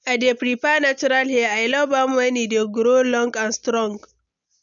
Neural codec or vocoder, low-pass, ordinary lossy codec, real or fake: none; 7.2 kHz; none; real